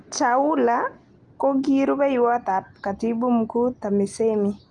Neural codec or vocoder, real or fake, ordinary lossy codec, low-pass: none; real; Opus, 32 kbps; 10.8 kHz